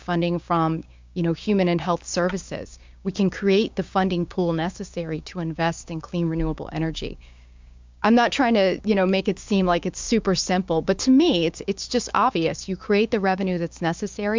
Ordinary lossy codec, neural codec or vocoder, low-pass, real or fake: MP3, 64 kbps; codec, 16 kHz, 6 kbps, DAC; 7.2 kHz; fake